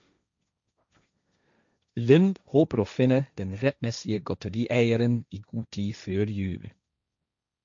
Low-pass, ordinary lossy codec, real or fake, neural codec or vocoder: 7.2 kHz; MP3, 64 kbps; fake; codec, 16 kHz, 1.1 kbps, Voila-Tokenizer